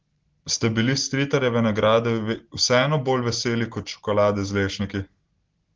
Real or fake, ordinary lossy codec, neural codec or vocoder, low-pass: real; Opus, 16 kbps; none; 7.2 kHz